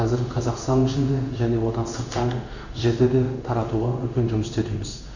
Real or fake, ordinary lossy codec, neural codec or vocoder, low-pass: fake; none; codec, 16 kHz in and 24 kHz out, 1 kbps, XY-Tokenizer; 7.2 kHz